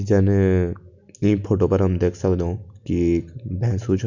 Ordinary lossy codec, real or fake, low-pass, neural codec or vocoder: none; real; 7.2 kHz; none